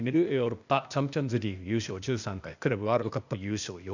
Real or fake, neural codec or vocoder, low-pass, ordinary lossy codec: fake; codec, 16 kHz, 0.8 kbps, ZipCodec; 7.2 kHz; none